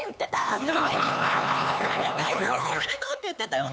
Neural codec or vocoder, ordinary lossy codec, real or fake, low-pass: codec, 16 kHz, 4 kbps, X-Codec, HuBERT features, trained on LibriSpeech; none; fake; none